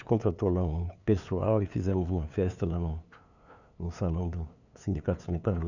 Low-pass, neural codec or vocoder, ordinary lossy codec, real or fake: 7.2 kHz; codec, 16 kHz, 2 kbps, FunCodec, trained on LibriTTS, 25 frames a second; none; fake